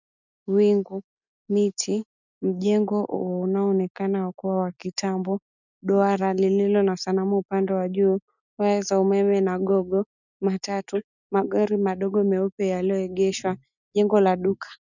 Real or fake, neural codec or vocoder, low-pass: real; none; 7.2 kHz